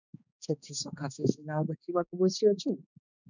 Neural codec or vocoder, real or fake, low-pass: codec, 16 kHz, 2 kbps, X-Codec, HuBERT features, trained on balanced general audio; fake; 7.2 kHz